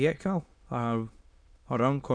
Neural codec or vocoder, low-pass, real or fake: autoencoder, 22.05 kHz, a latent of 192 numbers a frame, VITS, trained on many speakers; 9.9 kHz; fake